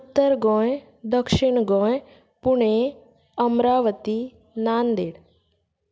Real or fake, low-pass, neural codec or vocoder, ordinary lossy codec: real; none; none; none